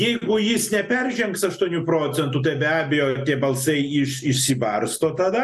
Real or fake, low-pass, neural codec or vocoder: real; 14.4 kHz; none